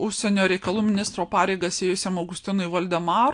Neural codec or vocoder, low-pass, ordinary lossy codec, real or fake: none; 9.9 kHz; AAC, 64 kbps; real